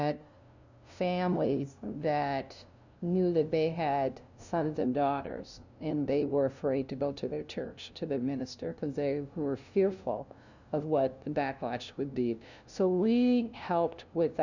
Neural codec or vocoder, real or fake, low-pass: codec, 16 kHz, 0.5 kbps, FunCodec, trained on LibriTTS, 25 frames a second; fake; 7.2 kHz